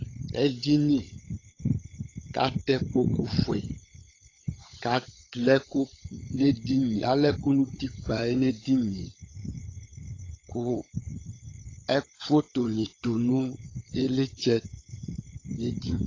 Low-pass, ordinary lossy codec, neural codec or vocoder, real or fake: 7.2 kHz; AAC, 32 kbps; codec, 16 kHz, 16 kbps, FunCodec, trained on LibriTTS, 50 frames a second; fake